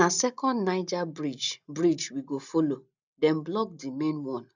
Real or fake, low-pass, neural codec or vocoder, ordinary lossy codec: real; 7.2 kHz; none; none